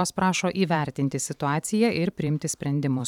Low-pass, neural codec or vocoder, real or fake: 19.8 kHz; vocoder, 44.1 kHz, 128 mel bands every 512 samples, BigVGAN v2; fake